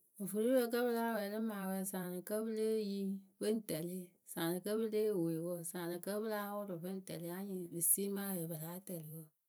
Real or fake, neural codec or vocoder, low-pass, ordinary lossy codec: fake; vocoder, 44.1 kHz, 128 mel bands every 512 samples, BigVGAN v2; none; none